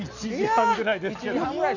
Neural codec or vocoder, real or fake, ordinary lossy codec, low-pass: none; real; none; 7.2 kHz